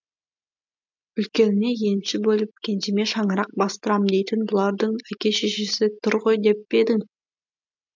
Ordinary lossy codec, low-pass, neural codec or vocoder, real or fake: MP3, 64 kbps; 7.2 kHz; none; real